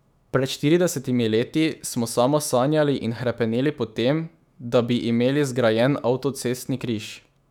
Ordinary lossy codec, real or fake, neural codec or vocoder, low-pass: none; fake; autoencoder, 48 kHz, 128 numbers a frame, DAC-VAE, trained on Japanese speech; 19.8 kHz